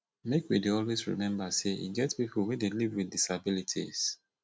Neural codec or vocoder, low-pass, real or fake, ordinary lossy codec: none; none; real; none